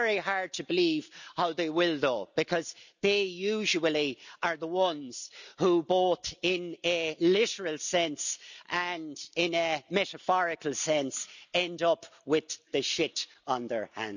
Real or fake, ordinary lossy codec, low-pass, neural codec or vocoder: real; none; 7.2 kHz; none